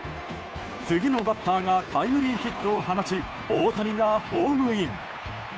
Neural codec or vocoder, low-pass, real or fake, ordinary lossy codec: codec, 16 kHz, 2 kbps, FunCodec, trained on Chinese and English, 25 frames a second; none; fake; none